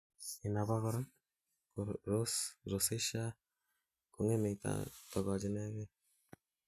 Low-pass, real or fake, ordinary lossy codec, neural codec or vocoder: 14.4 kHz; real; none; none